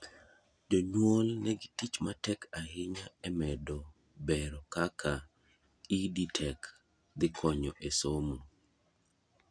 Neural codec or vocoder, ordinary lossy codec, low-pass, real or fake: none; none; 9.9 kHz; real